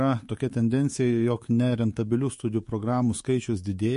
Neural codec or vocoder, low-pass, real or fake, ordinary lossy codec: codec, 24 kHz, 3.1 kbps, DualCodec; 10.8 kHz; fake; MP3, 48 kbps